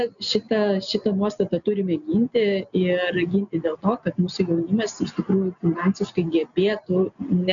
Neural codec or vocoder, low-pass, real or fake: none; 7.2 kHz; real